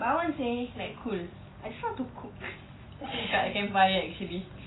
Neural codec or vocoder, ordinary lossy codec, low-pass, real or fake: none; AAC, 16 kbps; 7.2 kHz; real